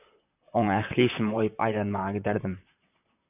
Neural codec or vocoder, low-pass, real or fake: vocoder, 44.1 kHz, 128 mel bands, Pupu-Vocoder; 3.6 kHz; fake